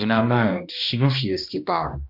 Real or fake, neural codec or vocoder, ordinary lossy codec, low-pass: fake; codec, 16 kHz, 1 kbps, X-Codec, HuBERT features, trained on balanced general audio; MP3, 48 kbps; 5.4 kHz